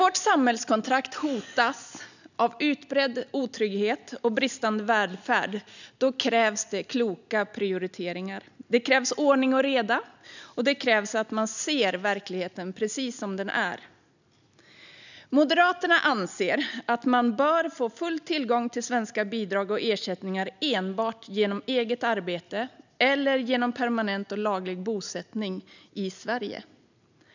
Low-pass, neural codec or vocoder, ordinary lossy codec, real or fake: 7.2 kHz; none; none; real